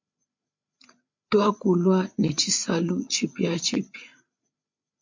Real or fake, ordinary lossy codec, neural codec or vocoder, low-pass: fake; MP3, 48 kbps; codec, 16 kHz, 8 kbps, FreqCodec, larger model; 7.2 kHz